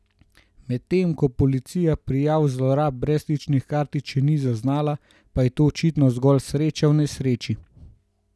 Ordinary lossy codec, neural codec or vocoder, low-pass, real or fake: none; none; none; real